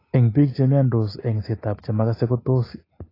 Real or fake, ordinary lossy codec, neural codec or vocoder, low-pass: real; AAC, 24 kbps; none; 5.4 kHz